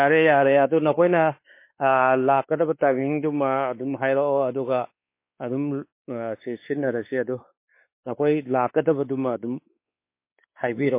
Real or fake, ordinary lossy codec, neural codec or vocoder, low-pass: fake; MP3, 24 kbps; codec, 16 kHz, 4 kbps, X-Codec, WavLM features, trained on Multilingual LibriSpeech; 3.6 kHz